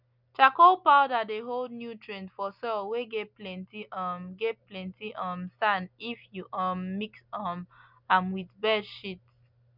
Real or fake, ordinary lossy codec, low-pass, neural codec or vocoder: real; none; 5.4 kHz; none